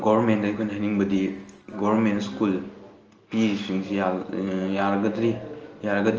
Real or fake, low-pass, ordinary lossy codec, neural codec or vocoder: real; 7.2 kHz; Opus, 24 kbps; none